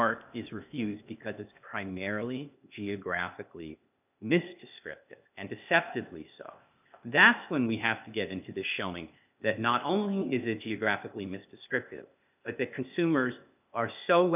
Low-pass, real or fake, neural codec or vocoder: 3.6 kHz; fake; codec, 16 kHz, 0.8 kbps, ZipCodec